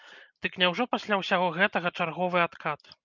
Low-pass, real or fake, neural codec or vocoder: 7.2 kHz; real; none